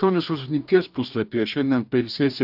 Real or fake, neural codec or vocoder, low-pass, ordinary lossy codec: fake; codec, 44.1 kHz, 2.6 kbps, DAC; 5.4 kHz; AAC, 48 kbps